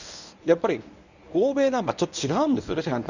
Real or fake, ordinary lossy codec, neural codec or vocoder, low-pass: fake; none; codec, 24 kHz, 0.9 kbps, WavTokenizer, medium speech release version 1; 7.2 kHz